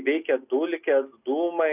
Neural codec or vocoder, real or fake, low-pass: none; real; 3.6 kHz